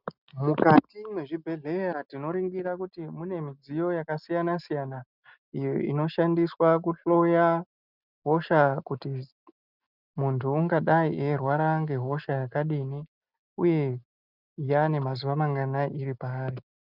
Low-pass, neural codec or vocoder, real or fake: 5.4 kHz; none; real